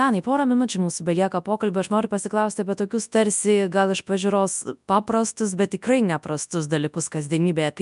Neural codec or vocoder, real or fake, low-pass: codec, 24 kHz, 0.9 kbps, WavTokenizer, large speech release; fake; 10.8 kHz